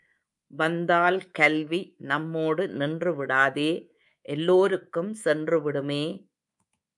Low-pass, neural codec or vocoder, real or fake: 10.8 kHz; codec, 24 kHz, 3.1 kbps, DualCodec; fake